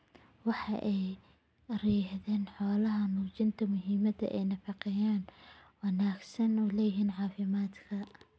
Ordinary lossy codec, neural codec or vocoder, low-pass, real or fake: none; none; none; real